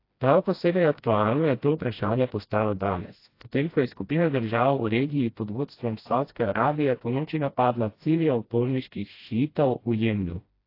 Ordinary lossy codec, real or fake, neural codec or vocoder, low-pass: AAC, 32 kbps; fake; codec, 16 kHz, 1 kbps, FreqCodec, smaller model; 5.4 kHz